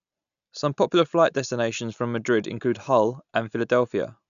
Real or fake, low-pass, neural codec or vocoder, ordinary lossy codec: real; 7.2 kHz; none; none